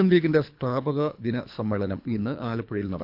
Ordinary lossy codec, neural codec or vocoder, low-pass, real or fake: none; codec, 24 kHz, 3 kbps, HILCodec; 5.4 kHz; fake